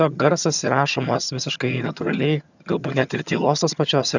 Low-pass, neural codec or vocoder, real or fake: 7.2 kHz; vocoder, 22.05 kHz, 80 mel bands, HiFi-GAN; fake